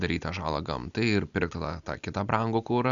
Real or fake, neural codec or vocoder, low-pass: real; none; 7.2 kHz